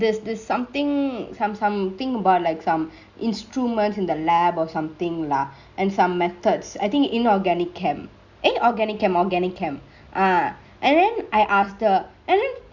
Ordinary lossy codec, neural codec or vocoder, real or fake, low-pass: Opus, 64 kbps; none; real; 7.2 kHz